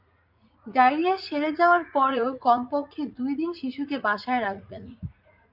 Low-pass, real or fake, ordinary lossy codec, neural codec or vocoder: 5.4 kHz; fake; MP3, 48 kbps; vocoder, 44.1 kHz, 80 mel bands, Vocos